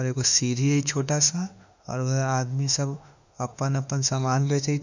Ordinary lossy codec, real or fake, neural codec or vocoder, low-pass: none; fake; autoencoder, 48 kHz, 32 numbers a frame, DAC-VAE, trained on Japanese speech; 7.2 kHz